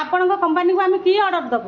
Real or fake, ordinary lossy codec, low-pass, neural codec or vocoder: real; Opus, 64 kbps; 7.2 kHz; none